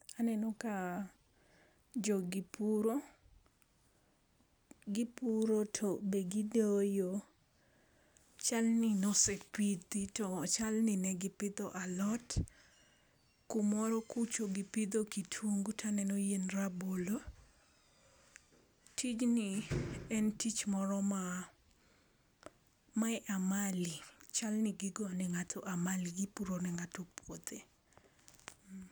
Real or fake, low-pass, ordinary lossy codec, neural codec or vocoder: real; none; none; none